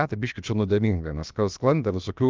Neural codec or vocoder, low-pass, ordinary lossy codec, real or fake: codec, 16 kHz, about 1 kbps, DyCAST, with the encoder's durations; 7.2 kHz; Opus, 24 kbps; fake